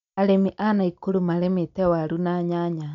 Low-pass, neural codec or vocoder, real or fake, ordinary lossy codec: 7.2 kHz; none; real; none